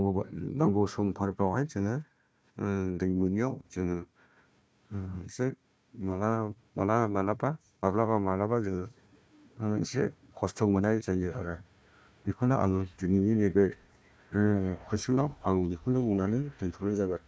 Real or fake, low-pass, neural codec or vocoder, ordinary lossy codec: fake; none; codec, 16 kHz, 1 kbps, FunCodec, trained on Chinese and English, 50 frames a second; none